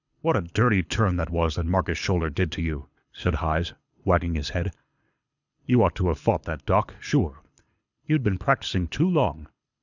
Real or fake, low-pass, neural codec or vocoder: fake; 7.2 kHz; codec, 24 kHz, 6 kbps, HILCodec